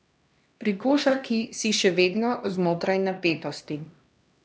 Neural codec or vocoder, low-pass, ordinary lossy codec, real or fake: codec, 16 kHz, 1 kbps, X-Codec, HuBERT features, trained on LibriSpeech; none; none; fake